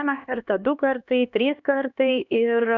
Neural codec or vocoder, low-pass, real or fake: codec, 16 kHz, 2 kbps, X-Codec, HuBERT features, trained on LibriSpeech; 7.2 kHz; fake